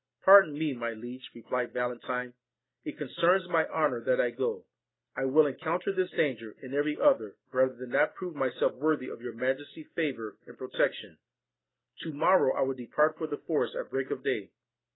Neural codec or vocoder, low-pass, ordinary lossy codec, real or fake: none; 7.2 kHz; AAC, 16 kbps; real